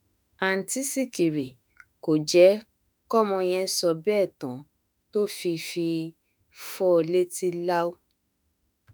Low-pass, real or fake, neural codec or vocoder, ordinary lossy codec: none; fake; autoencoder, 48 kHz, 32 numbers a frame, DAC-VAE, trained on Japanese speech; none